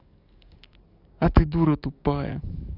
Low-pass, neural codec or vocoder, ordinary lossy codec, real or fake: 5.4 kHz; codec, 44.1 kHz, 7.8 kbps, DAC; none; fake